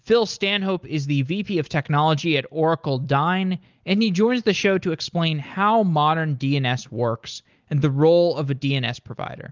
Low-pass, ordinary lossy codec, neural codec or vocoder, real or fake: 7.2 kHz; Opus, 32 kbps; none; real